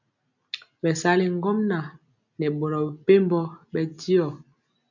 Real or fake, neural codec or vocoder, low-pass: real; none; 7.2 kHz